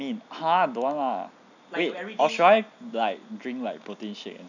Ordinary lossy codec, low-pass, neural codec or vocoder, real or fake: none; 7.2 kHz; none; real